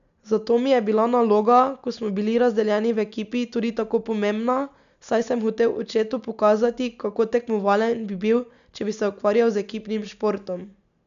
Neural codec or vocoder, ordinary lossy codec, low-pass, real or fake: none; none; 7.2 kHz; real